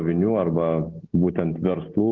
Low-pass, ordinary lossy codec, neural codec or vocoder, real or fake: 7.2 kHz; Opus, 16 kbps; none; real